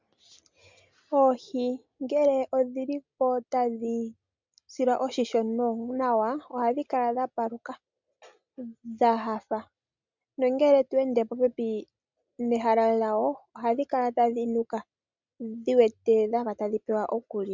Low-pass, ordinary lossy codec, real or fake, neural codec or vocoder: 7.2 kHz; MP3, 64 kbps; real; none